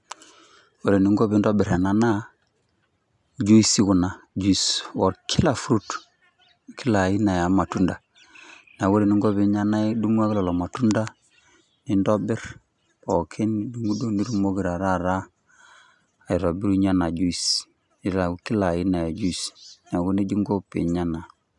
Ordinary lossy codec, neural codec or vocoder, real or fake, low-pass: none; none; real; 10.8 kHz